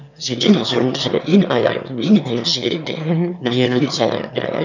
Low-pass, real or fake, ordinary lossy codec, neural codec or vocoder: 7.2 kHz; fake; none; autoencoder, 22.05 kHz, a latent of 192 numbers a frame, VITS, trained on one speaker